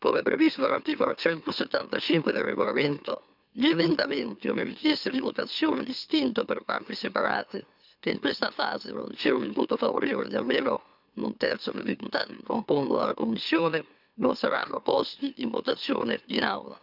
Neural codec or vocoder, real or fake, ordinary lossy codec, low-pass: autoencoder, 44.1 kHz, a latent of 192 numbers a frame, MeloTTS; fake; none; 5.4 kHz